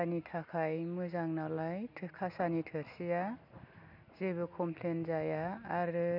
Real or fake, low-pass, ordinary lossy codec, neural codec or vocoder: real; 5.4 kHz; none; none